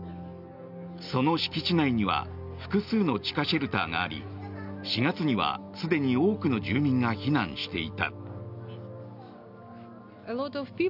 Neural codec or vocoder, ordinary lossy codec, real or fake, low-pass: none; none; real; 5.4 kHz